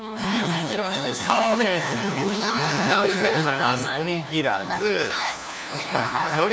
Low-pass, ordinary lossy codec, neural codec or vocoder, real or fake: none; none; codec, 16 kHz, 1 kbps, FunCodec, trained on LibriTTS, 50 frames a second; fake